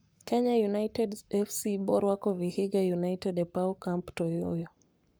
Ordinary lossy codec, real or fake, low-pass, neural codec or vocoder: none; fake; none; codec, 44.1 kHz, 7.8 kbps, Pupu-Codec